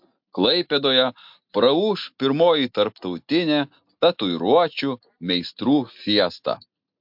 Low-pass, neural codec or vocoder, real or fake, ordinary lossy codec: 5.4 kHz; none; real; MP3, 48 kbps